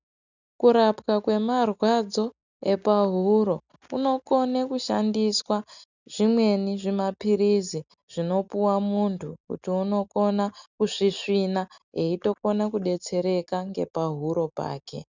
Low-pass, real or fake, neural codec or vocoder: 7.2 kHz; real; none